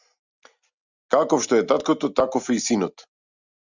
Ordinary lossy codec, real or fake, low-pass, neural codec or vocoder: Opus, 64 kbps; real; 7.2 kHz; none